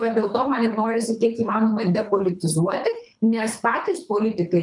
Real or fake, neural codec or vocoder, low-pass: fake; codec, 24 kHz, 3 kbps, HILCodec; 10.8 kHz